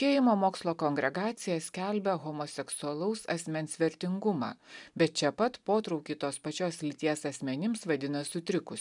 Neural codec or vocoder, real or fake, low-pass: none; real; 10.8 kHz